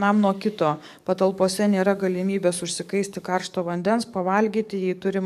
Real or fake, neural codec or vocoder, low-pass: fake; codec, 44.1 kHz, 7.8 kbps, DAC; 14.4 kHz